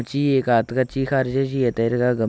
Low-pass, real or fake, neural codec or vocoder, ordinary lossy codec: none; real; none; none